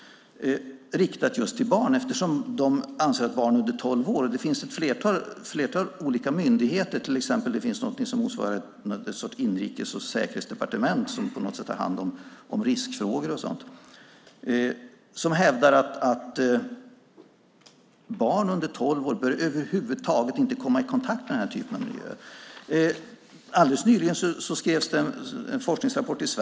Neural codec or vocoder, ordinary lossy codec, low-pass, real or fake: none; none; none; real